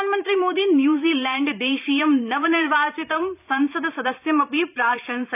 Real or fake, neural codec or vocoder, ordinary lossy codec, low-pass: fake; vocoder, 44.1 kHz, 128 mel bands every 512 samples, BigVGAN v2; none; 3.6 kHz